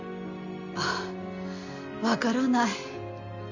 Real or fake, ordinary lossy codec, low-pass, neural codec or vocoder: real; none; 7.2 kHz; none